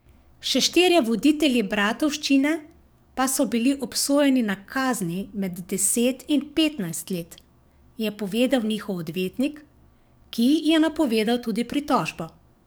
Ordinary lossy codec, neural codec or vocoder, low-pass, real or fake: none; codec, 44.1 kHz, 7.8 kbps, DAC; none; fake